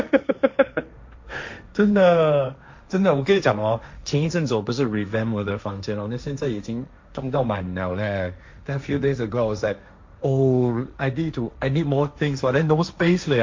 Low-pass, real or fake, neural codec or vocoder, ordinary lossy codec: 7.2 kHz; fake; codec, 16 kHz, 1.1 kbps, Voila-Tokenizer; MP3, 48 kbps